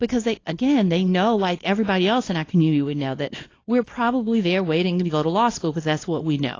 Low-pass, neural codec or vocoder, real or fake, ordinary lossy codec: 7.2 kHz; codec, 24 kHz, 0.9 kbps, WavTokenizer, small release; fake; AAC, 32 kbps